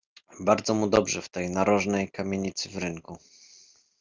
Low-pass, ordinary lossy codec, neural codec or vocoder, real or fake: 7.2 kHz; Opus, 24 kbps; none; real